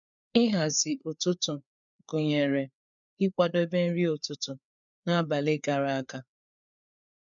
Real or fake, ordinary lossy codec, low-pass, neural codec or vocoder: fake; none; 7.2 kHz; codec, 16 kHz, 4 kbps, FreqCodec, larger model